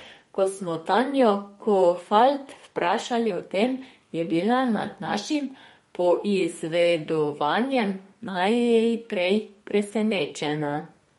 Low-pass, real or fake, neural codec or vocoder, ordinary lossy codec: 14.4 kHz; fake; codec, 32 kHz, 1.9 kbps, SNAC; MP3, 48 kbps